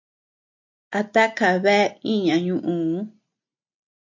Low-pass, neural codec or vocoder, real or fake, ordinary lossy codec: 7.2 kHz; none; real; MP3, 48 kbps